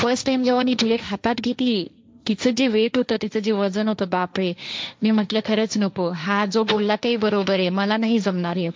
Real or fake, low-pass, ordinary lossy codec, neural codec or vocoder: fake; none; none; codec, 16 kHz, 1.1 kbps, Voila-Tokenizer